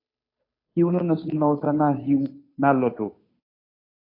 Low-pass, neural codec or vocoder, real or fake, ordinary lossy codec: 5.4 kHz; codec, 16 kHz, 2 kbps, FunCodec, trained on Chinese and English, 25 frames a second; fake; AAC, 24 kbps